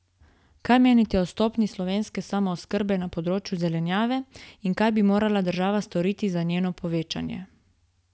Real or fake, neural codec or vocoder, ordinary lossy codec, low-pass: real; none; none; none